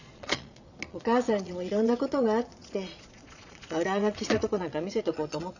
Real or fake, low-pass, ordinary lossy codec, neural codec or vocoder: fake; 7.2 kHz; none; vocoder, 22.05 kHz, 80 mel bands, Vocos